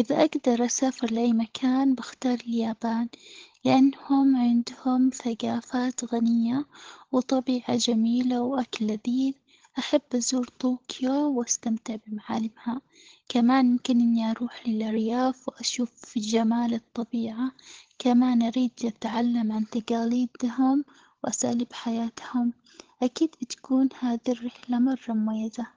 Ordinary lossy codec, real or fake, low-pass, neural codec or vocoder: Opus, 16 kbps; fake; 7.2 kHz; codec, 16 kHz, 16 kbps, FunCodec, trained on LibriTTS, 50 frames a second